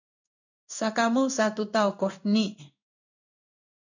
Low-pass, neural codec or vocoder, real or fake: 7.2 kHz; codec, 16 kHz in and 24 kHz out, 1 kbps, XY-Tokenizer; fake